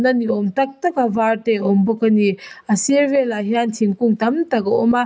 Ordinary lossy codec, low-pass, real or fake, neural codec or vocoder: none; none; real; none